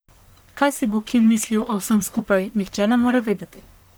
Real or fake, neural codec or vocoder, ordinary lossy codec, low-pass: fake; codec, 44.1 kHz, 1.7 kbps, Pupu-Codec; none; none